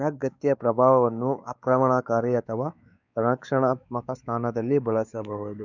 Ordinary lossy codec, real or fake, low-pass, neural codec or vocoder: none; fake; 7.2 kHz; codec, 16 kHz, 4 kbps, X-Codec, WavLM features, trained on Multilingual LibriSpeech